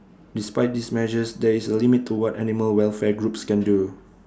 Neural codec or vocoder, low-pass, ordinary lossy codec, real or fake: none; none; none; real